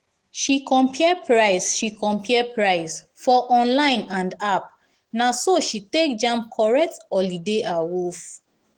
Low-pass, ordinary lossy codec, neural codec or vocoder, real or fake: 19.8 kHz; Opus, 16 kbps; autoencoder, 48 kHz, 128 numbers a frame, DAC-VAE, trained on Japanese speech; fake